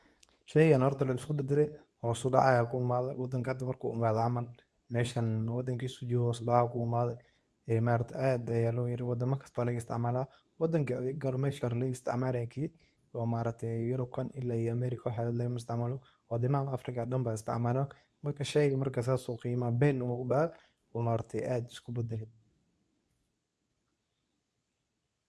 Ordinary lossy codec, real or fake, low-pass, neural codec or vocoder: none; fake; none; codec, 24 kHz, 0.9 kbps, WavTokenizer, medium speech release version 2